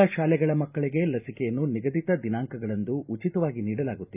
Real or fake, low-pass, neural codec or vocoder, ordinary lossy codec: real; 3.6 kHz; none; none